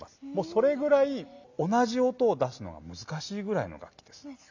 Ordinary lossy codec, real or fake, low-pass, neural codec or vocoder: none; real; 7.2 kHz; none